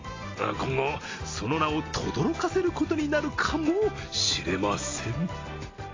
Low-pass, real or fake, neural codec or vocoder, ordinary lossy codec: 7.2 kHz; real; none; AAC, 32 kbps